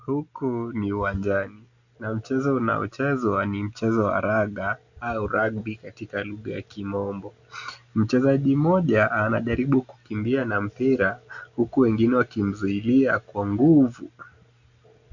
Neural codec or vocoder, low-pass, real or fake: none; 7.2 kHz; real